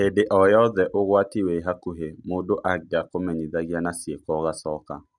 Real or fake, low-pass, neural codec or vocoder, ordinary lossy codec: real; 10.8 kHz; none; none